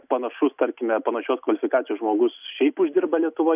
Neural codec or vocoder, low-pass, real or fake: none; 3.6 kHz; real